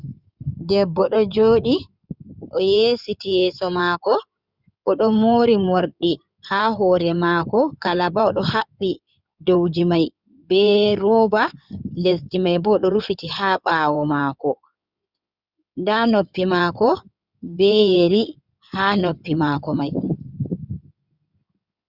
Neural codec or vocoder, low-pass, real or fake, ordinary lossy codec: codec, 16 kHz in and 24 kHz out, 2.2 kbps, FireRedTTS-2 codec; 5.4 kHz; fake; Opus, 64 kbps